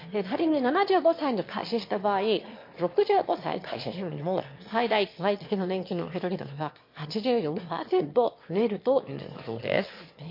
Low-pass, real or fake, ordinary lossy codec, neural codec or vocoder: 5.4 kHz; fake; MP3, 32 kbps; autoencoder, 22.05 kHz, a latent of 192 numbers a frame, VITS, trained on one speaker